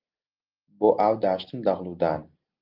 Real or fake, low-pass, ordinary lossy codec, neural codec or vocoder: real; 5.4 kHz; Opus, 24 kbps; none